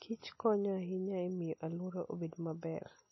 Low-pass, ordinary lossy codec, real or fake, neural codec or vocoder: 7.2 kHz; MP3, 24 kbps; real; none